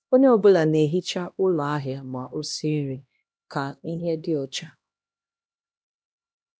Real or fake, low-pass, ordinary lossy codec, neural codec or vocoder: fake; none; none; codec, 16 kHz, 1 kbps, X-Codec, HuBERT features, trained on LibriSpeech